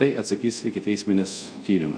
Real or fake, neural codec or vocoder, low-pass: fake; codec, 24 kHz, 0.5 kbps, DualCodec; 9.9 kHz